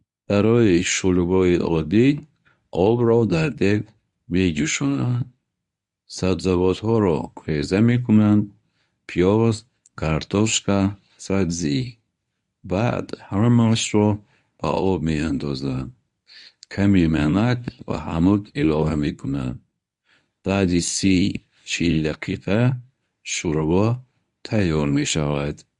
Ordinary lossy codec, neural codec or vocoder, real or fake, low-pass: MP3, 64 kbps; codec, 24 kHz, 0.9 kbps, WavTokenizer, medium speech release version 1; fake; 10.8 kHz